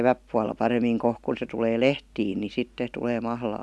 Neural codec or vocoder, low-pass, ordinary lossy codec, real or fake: none; none; none; real